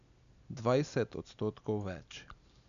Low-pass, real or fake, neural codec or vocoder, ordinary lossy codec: 7.2 kHz; real; none; none